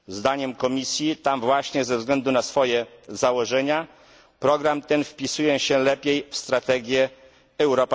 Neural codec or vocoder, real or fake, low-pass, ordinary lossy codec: none; real; none; none